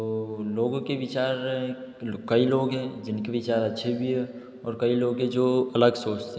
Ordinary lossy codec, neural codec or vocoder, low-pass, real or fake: none; none; none; real